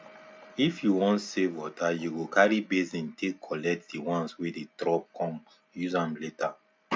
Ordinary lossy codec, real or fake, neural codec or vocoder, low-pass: none; real; none; none